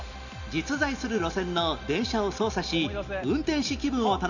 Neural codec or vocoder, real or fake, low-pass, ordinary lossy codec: none; real; 7.2 kHz; none